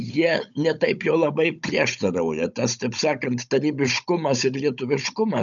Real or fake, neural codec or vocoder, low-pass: fake; codec, 16 kHz, 16 kbps, FunCodec, trained on Chinese and English, 50 frames a second; 7.2 kHz